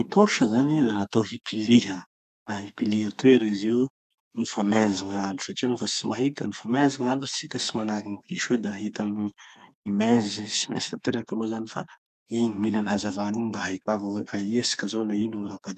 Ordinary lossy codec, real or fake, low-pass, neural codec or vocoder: none; fake; 14.4 kHz; codec, 32 kHz, 1.9 kbps, SNAC